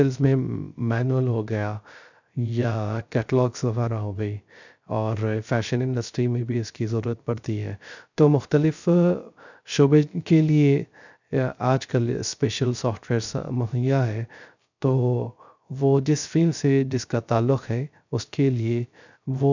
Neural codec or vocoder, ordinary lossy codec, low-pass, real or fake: codec, 16 kHz, 0.3 kbps, FocalCodec; none; 7.2 kHz; fake